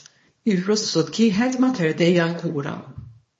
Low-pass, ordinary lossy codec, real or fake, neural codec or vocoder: 10.8 kHz; MP3, 32 kbps; fake; codec, 24 kHz, 0.9 kbps, WavTokenizer, small release